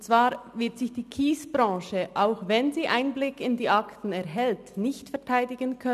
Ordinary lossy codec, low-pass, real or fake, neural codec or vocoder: none; 14.4 kHz; real; none